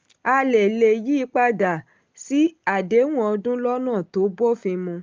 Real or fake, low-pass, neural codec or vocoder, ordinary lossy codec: real; 7.2 kHz; none; Opus, 24 kbps